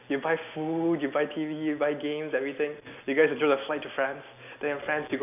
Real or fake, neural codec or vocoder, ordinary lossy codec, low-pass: real; none; none; 3.6 kHz